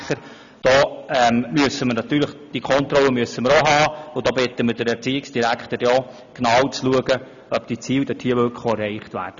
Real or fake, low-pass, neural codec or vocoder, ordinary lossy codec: real; 7.2 kHz; none; none